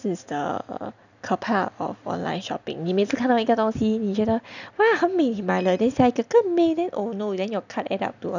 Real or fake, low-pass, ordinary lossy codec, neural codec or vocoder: real; 7.2 kHz; none; none